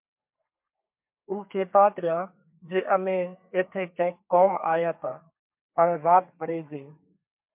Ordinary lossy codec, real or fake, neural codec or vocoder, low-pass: MP3, 32 kbps; fake; codec, 32 kHz, 1.9 kbps, SNAC; 3.6 kHz